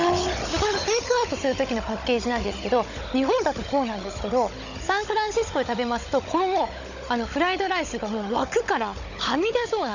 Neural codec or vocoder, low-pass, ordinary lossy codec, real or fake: codec, 16 kHz, 16 kbps, FunCodec, trained on Chinese and English, 50 frames a second; 7.2 kHz; none; fake